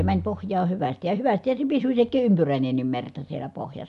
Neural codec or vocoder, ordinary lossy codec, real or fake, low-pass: none; none; real; 10.8 kHz